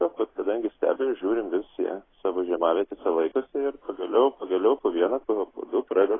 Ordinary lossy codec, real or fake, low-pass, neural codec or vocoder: AAC, 16 kbps; real; 7.2 kHz; none